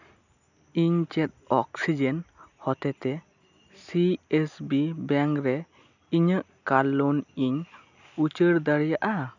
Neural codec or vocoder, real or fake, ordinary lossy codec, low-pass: none; real; none; 7.2 kHz